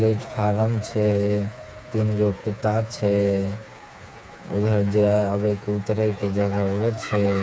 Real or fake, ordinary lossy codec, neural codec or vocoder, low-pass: fake; none; codec, 16 kHz, 4 kbps, FreqCodec, smaller model; none